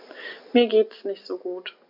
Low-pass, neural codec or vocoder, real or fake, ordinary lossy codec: 5.4 kHz; none; real; none